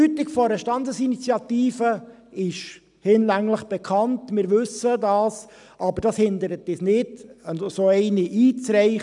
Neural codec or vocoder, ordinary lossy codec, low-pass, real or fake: none; none; 10.8 kHz; real